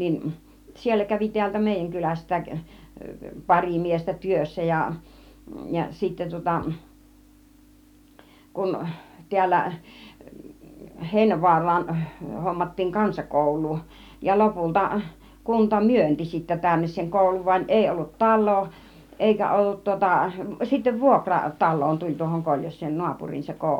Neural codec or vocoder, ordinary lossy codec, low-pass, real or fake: none; none; 19.8 kHz; real